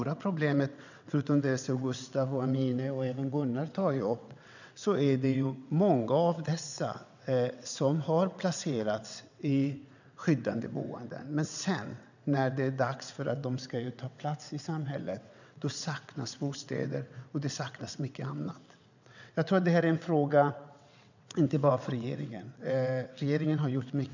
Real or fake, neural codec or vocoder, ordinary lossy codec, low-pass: fake; vocoder, 22.05 kHz, 80 mel bands, WaveNeXt; none; 7.2 kHz